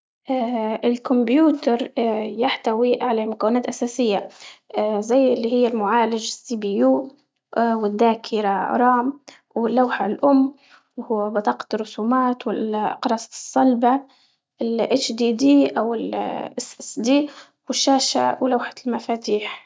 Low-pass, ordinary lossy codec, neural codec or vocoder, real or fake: none; none; none; real